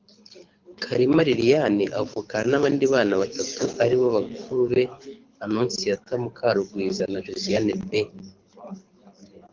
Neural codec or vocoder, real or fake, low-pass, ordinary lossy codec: codec, 24 kHz, 6 kbps, HILCodec; fake; 7.2 kHz; Opus, 24 kbps